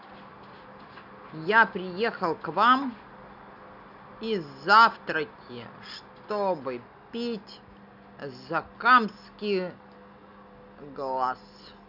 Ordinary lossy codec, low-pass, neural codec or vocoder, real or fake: none; 5.4 kHz; none; real